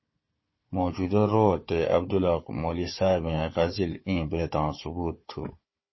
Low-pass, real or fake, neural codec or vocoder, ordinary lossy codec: 7.2 kHz; fake; codec, 16 kHz, 4 kbps, FunCodec, trained on Chinese and English, 50 frames a second; MP3, 24 kbps